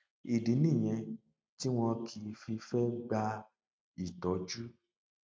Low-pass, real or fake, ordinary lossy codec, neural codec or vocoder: none; real; none; none